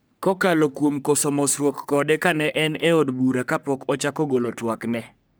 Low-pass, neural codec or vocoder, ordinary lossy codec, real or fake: none; codec, 44.1 kHz, 3.4 kbps, Pupu-Codec; none; fake